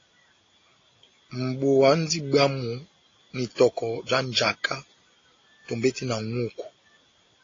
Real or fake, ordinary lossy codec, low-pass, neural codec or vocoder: real; AAC, 32 kbps; 7.2 kHz; none